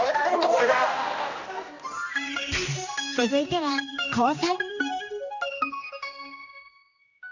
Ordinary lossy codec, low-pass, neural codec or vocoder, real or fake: none; 7.2 kHz; codec, 16 kHz, 1 kbps, X-Codec, HuBERT features, trained on general audio; fake